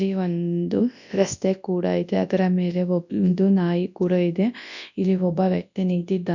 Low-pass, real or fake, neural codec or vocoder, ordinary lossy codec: 7.2 kHz; fake; codec, 24 kHz, 0.9 kbps, WavTokenizer, large speech release; none